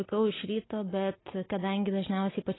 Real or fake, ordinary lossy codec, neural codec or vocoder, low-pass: real; AAC, 16 kbps; none; 7.2 kHz